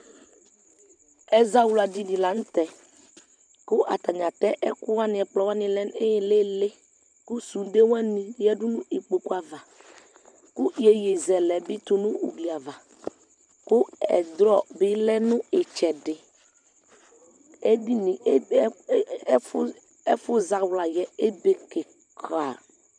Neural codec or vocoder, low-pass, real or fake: vocoder, 44.1 kHz, 128 mel bands every 512 samples, BigVGAN v2; 9.9 kHz; fake